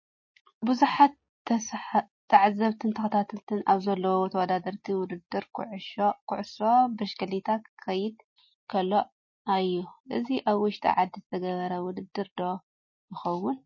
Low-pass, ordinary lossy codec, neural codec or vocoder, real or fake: 7.2 kHz; MP3, 32 kbps; none; real